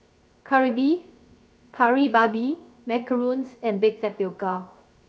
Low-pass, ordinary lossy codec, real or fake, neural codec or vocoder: none; none; fake; codec, 16 kHz, 0.7 kbps, FocalCodec